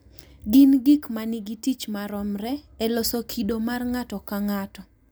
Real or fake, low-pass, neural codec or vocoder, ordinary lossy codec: real; none; none; none